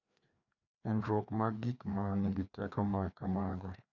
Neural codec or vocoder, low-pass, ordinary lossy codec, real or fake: codec, 16 kHz, 2 kbps, FreqCodec, larger model; 7.2 kHz; none; fake